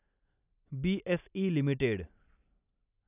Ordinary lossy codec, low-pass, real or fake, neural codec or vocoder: none; 3.6 kHz; real; none